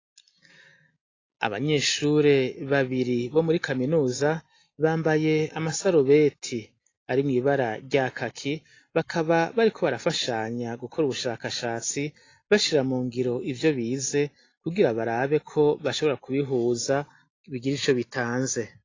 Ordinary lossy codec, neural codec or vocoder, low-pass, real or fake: AAC, 32 kbps; none; 7.2 kHz; real